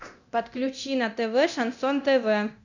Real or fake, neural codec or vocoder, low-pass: fake; codec, 24 kHz, 0.9 kbps, DualCodec; 7.2 kHz